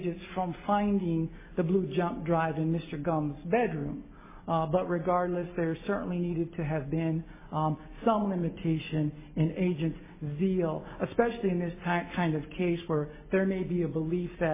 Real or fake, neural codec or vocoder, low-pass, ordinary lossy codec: real; none; 3.6 kHz; MP3, 16 kbps